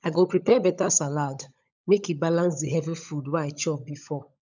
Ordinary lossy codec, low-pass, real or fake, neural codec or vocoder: none; 7.2 kHz; fake; codec, 16 kHz, 16 kbps, FunCodec, trained on LibriTTS, 50 frames a second